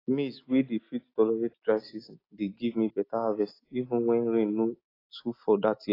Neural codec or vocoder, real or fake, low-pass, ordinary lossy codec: none; real; 5.4 kHz; AAC, 24 kbps